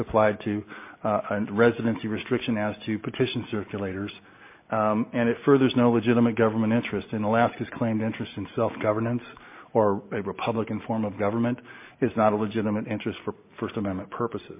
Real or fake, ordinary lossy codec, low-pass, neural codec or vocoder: real; MP3, 24 kbps; 3.6 kHz; none